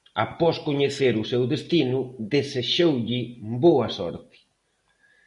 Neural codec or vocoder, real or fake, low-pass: none; real; 10.8 kHz